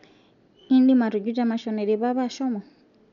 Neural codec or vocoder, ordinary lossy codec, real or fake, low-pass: none; none; real; 7.2 kHz